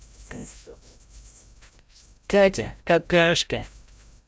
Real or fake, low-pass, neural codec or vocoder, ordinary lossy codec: fake; none; codec, 16 kHz, 0.5 kbps, FreqCodec, larger model; none